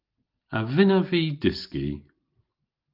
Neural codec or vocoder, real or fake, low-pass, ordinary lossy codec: none; real; 5.4 kHz; Opus, 32 kbps